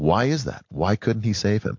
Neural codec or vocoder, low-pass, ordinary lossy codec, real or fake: none; 7.2 kHz; MP3, 48 kbps; real